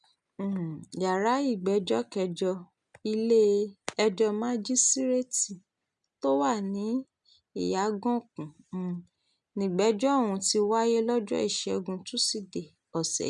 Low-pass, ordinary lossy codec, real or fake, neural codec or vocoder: 10.8 kHz; none; real; none